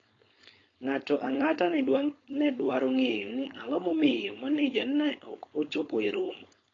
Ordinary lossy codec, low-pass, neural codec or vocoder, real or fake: AAC, 32 kbps; 7.2 kHz; codec, 16 kHz, 4.8 kbps, FACodec; fake